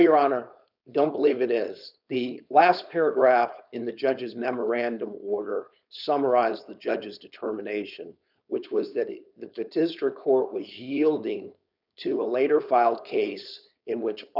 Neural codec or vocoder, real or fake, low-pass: codec, 16 kHz, 4.8 kbps, FACodec; fake; 5.4 kHz